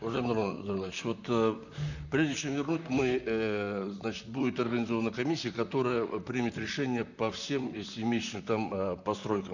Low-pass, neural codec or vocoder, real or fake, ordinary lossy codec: 7.2 kHz; vocoder, 44.1 kHz, 128 mel bands, Pupu-Vocoder; fake; none